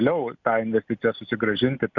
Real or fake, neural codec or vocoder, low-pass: real; none; 7.2 kHz